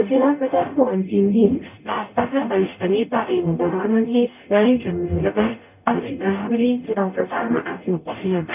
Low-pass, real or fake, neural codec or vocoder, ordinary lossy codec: 3.6 kHz; fake; codec, 44.1 kHz, 0.9 kbps, DAC; none